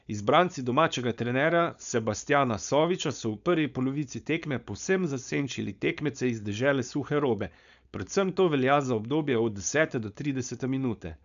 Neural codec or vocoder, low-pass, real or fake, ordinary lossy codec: codec, 16 kHz, 4.8 kbps, FACodec; 7.2 kHz; fake; none